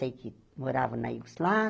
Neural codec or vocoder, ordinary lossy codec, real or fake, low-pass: none; none; real; none